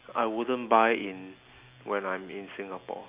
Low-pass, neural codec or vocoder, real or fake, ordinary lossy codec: 3.6 kHz; none; real; Opus, 64 kbps